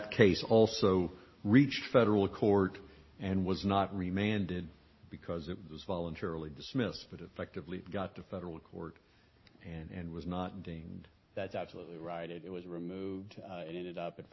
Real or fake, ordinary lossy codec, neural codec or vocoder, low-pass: real; MP3, 24 kbps; none; 7.2 kHz